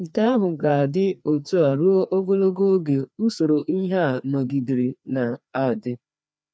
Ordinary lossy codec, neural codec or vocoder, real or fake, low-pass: none; codec, 16 kHz, 2 kbps, FreqCodec, larger model; fake; none